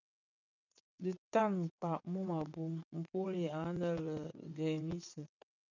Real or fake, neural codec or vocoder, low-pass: fake; vocoder, 22.05 kHz, 80 mel bands, WaveNeXt; 7.2 kHz